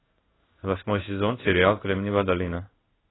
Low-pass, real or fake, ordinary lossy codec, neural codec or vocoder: 7.2 kHz; fake; AAC, 16 kbps; codec, 16 kHz in and 24 kHz out, 1 kbps, XY-Tokenizer